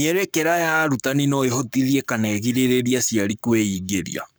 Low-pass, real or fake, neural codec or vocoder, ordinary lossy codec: none; fake; codec, 44.1 kHz, 7.8 kbps, Pupu-Codec; none